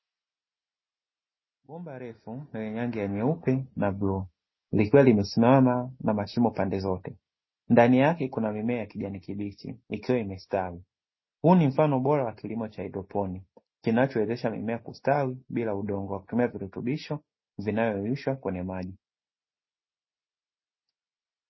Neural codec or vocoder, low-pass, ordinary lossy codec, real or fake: none; 7.2 kHz; MP3, 24 kbps; real